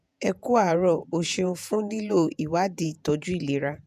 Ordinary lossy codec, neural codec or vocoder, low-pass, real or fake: none; vocoder, 48 kHz, 128 mel bands, Vocos; 14.4 kHz; fake